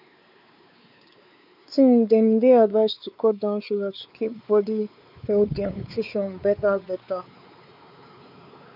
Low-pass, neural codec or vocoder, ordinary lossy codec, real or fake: 5.4 kHz; codec, 16 kHz, 4 kbps, X-Codec, WavLM features, trained on Multilingual LibriSpeech; none; fake